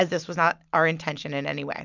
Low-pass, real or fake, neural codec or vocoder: 7.2 kHz; real; none